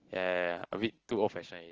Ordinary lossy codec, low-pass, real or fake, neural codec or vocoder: Opus, 16 kbps; 7.2 kHz; real; none